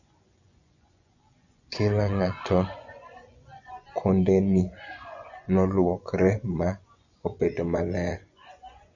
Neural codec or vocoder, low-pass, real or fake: vocoder, 24 kHz, 100 mel bands, Vocos; 7.2 kHz; fake